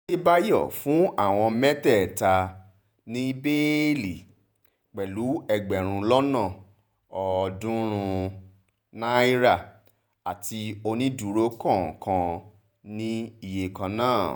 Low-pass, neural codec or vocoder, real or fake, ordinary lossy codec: none; vocoder, 48 kHz, 128 mel bands, Vocos; fake; none